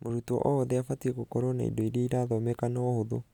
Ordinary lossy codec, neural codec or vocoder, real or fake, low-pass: none; none; real; 19.8 kHz